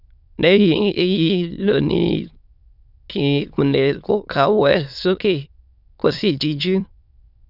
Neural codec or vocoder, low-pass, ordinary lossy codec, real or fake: autoencoder, 22.05 kHz, a latent of 192 numbers a frame, VITS, trained on many speakers; 5.4 kHz; none; fake